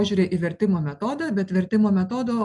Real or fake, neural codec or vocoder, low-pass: real; none; 10.8 kHz